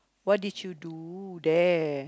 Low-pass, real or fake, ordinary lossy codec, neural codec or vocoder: none; real; none; none